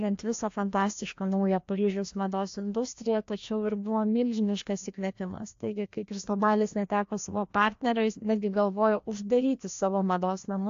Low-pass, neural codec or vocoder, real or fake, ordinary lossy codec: 7.2 kHz; codec, 16 kHz, 1 kbps, FreqCodec, larger model; fake; AAC, 48 kbps